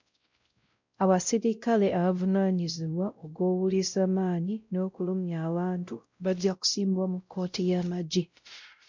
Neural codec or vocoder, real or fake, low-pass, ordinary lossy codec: codec, 16 kHz, 0.5 kbps, X-Codec, WavLM features, trained on Multilingual LibriSpeech; fake; 7.2 kHz; MP3, 64 kbps